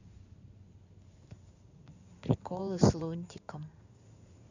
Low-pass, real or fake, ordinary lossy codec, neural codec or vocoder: 7.2 kHz; fake; none; codec, 16 kHz in and 24 kHz out, 2.2 kbps, FireRedTTS-2 codec